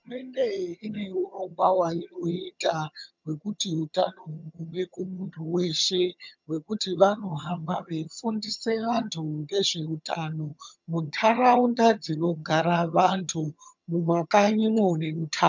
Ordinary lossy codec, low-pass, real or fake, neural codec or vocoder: MP3, 64 kbps; 7.2 kHz; fake; vocoder, 22.05 kHz, 80 mel bands, HiFi-GAN